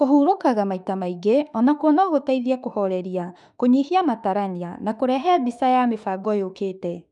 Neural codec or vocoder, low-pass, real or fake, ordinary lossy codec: autoencoder, 48 kHz, 32 numbers a frame, DAC-VAE, trained on Japanese speech; 10.8 kHz; fake; none